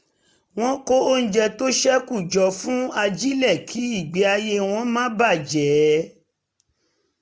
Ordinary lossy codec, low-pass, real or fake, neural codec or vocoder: none; none; real; none